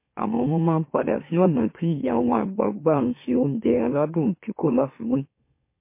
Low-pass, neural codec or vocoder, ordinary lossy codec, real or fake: 3.6 kHz; autoencoder, 44.1 kHz, a latent of 192 numbers a frame, MeloTTS; MP3, 24 kbps; fake